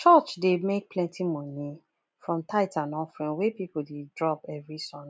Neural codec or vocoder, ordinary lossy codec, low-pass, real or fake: none; none; none; real